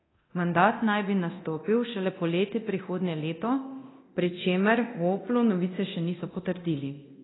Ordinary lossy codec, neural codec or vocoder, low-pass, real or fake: AAC, 16 kbps; codec, 24 kHz, 0.9 kbps, DualCodec; 7.2 kHz; fake